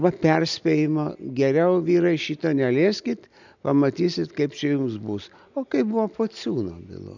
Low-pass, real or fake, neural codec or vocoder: 7.2 kHz; real; none